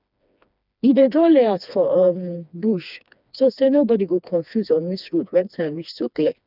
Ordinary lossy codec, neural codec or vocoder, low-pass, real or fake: none; codec, 16 kHz, 2 kbps, FreqCodec, smaller model; 5.4 kHz; fake